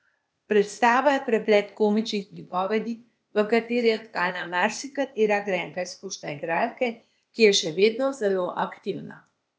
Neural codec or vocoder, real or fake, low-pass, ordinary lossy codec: codec, 16 kHz, 0.8 kbps, ZipCodec; fake; none; none